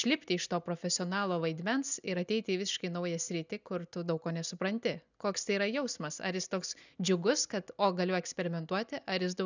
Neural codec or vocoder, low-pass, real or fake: none; 7.2 kHz; real